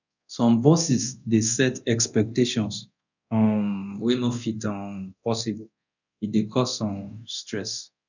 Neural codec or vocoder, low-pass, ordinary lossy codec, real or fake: codec, 24 kHz, 0.9 kbps, DualCodec; 7.2 kHz; none; fake